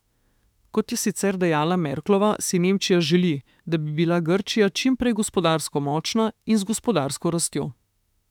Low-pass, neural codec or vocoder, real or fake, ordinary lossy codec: 19.8 kHz; autoencoder, 48 kHz, 32 numbers a frame, DAC-VAE, trained on Japanese speech; fake; none